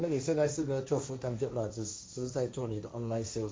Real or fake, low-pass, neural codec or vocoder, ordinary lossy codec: fake; none; codec, 16 kHz, 1.1 kbps, Voila-Tokenizer; none